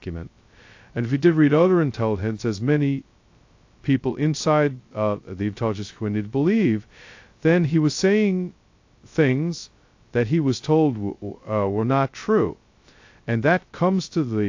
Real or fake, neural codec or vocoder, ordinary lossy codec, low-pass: fake; codec, 16 kHz, 0.2 kbps, FocalCodec; AAC, 48 kbps; 7.2 kHz